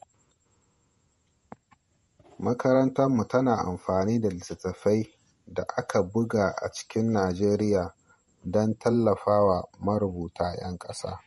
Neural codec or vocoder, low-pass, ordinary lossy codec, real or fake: vocoder, 48 kHz, 128 mel bands, Vocos; 19.8 kHz; MP3, 48 kbps; fake